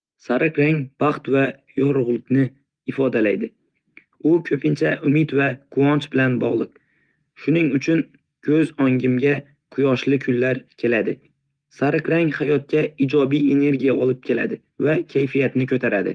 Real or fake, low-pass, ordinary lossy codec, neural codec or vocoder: real; 9.9 kHz; Opus, 24 kbps; none